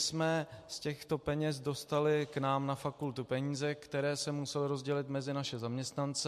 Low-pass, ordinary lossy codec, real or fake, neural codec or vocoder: 14.4 kHz; MP3, 64 kbps; real; none